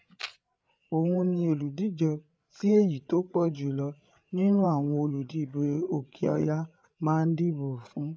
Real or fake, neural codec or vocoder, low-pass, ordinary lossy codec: fake; codec, 16 kHz, 8 kbps, FreqCodec, larger model; none; none